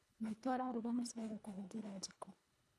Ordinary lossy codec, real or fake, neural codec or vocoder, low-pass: none; fake; codec, 24 kHz, 1.5 kbps, HILCodec; none